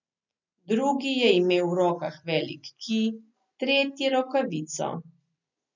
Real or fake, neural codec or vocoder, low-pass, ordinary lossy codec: real; none; 7.2 kHz; none